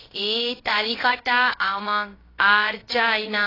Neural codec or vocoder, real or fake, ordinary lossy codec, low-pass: codec, 16 kHz, about 1 kbps, DyCAST, with the encoder's durations; fake; AAC, 24 kbps; 5.4 kHz